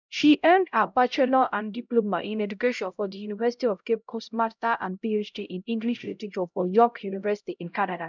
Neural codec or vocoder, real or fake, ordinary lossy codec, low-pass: codec, 16 kHz, 0.5 kbps, X-Codec, HuBERT features, trained on LibriSpeech; fake; none; 7.2 kHz